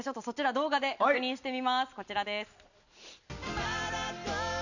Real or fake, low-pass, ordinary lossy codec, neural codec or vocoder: real; 7.2 kHz; none; none